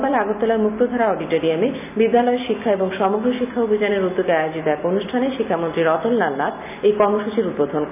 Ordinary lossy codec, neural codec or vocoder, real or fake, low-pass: none; none; real; 3.6 kHz